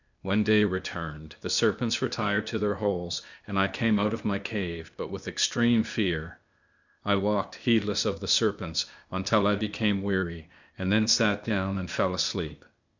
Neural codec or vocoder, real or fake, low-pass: codec, 16 kHz, 0.8 kbps, ZipCodec; fake; 7.2 kHz